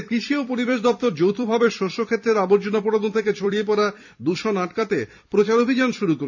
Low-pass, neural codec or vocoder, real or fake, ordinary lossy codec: 7.2 kHz; vocoder, 44.1 kHz, 128 mel bands every 256 samples, BigVGAN v2; fake; none